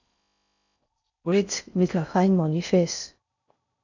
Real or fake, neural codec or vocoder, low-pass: fake; codec, 16 kHz in and 24 kHz out, 0.6 kbps, FocalCodec, streaming, 4096 codes; 7.2 kHz